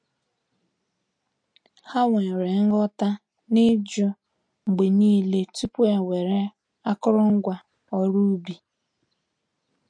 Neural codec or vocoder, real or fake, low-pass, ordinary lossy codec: none; real; 9.9 kHz; MP3, 48 kbps